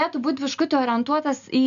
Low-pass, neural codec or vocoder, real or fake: 7.2 kHz; none; real